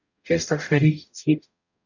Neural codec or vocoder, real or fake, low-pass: codec, 44.1 kHz, 0.9 kbps, DAC; fake; 7.2 kHz